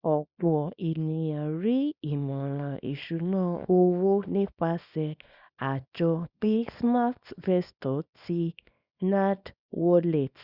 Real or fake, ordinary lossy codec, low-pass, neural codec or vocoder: fake; Opus, 64 kbps; 5.4 kHz; codec, 24 kHz, 0.9 kbps, WavTokenizer, small release